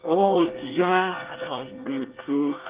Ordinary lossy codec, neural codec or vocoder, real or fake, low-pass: Opus, 32 kbps; codec, 24 kHz, 1 kbps, SNAC; fake; 3.6 kHz